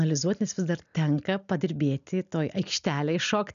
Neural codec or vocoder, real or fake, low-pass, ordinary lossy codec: none; real; 7.2 kHz; MP3, 96 kbps